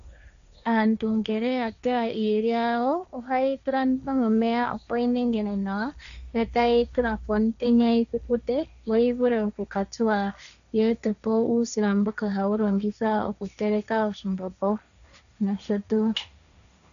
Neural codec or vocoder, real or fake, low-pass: codec, 16 kHz, 1.1 kbps, Voila-Tokenizer; fake; 7.2 kHz